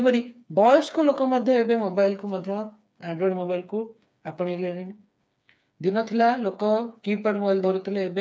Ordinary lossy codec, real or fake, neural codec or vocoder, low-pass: none; fake; codec, 16 kHz, 4 kbps, FreqCodec, smaller model; none